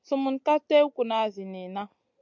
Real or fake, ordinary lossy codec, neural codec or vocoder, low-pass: real; MP3, 64 kbps; none; 7.2 kHz